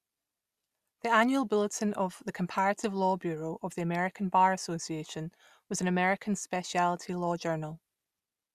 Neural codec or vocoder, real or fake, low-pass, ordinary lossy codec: none; real; 14.4 kHz; Opus, 64 kbps